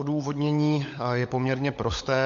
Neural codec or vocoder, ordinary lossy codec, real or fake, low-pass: codec, 16 kHz, 16 kbps, FunCodec, trained on LibriTTS, 50 frames a second; MP3, 48 kbps; fake; 7.2 kHz